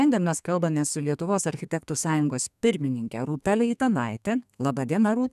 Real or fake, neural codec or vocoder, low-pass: fake; codec, 32 kHz, 1.9 kbps, SNAC; 14.4 kHz